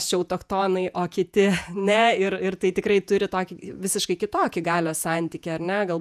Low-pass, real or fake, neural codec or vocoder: 14.4 kHz; fake; vocoder, 48 kHz, 128 mel bands, Vocos